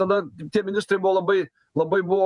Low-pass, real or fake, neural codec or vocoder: 10.8 kHz; fake; vocoder, 48 kHz, 128 mel bands, Vocos